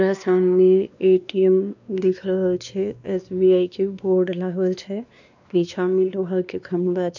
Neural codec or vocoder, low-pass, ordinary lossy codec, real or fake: codec, 16 kHz, 2 kbps, X-Codec, WavLM features, trained on Multilingual LibriSpeech; 7.2 kHz; none; fake